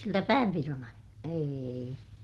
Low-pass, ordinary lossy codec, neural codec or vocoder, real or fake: 19.8 kHz; Opus, 16 kbps; none; real